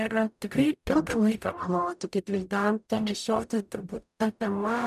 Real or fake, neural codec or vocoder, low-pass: fake; codec, 44.1 kHz, 0.9 kbps, DAC; 14.4 kHz